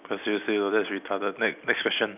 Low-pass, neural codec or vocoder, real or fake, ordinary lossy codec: 3.6 kHz; none; real; none